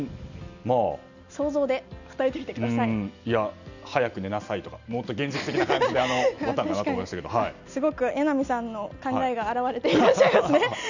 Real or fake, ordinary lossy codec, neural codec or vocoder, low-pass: real; none; none; 7.2 kHz